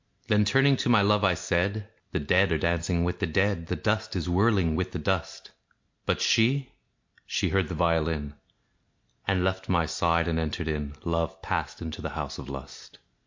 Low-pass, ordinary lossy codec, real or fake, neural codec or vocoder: 7.2 kHz; MP3, 48 kbps; real; none